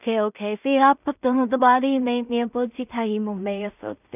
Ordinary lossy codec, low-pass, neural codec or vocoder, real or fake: none; 3.6 kHz; codec, 16 kHz in and 24 kHz out, 0.4 kbps, LongCat-Audio-Codec, two codebook decoder; fake